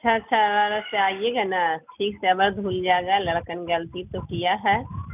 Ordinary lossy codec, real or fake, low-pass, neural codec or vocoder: none; real; 3.6 kHz; none